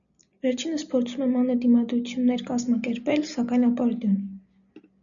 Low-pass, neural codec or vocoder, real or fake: 7.2 kHz; none; real